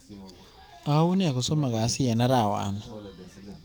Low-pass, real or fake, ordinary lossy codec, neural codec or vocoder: none; fake; none; codec, 44.1 kHz, 7.8 kbps, DAC